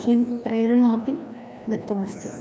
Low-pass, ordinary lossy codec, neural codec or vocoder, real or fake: none; none; codec, 16 kHz, 1 kbps, FreqCodec, larger model; fake